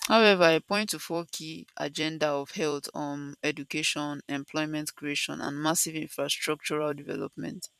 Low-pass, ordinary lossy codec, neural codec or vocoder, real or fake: 14.4 kHz; none; vocoder, 44.1 kHz, 128 mel bands every 256 samples, BigVGAN v2; fake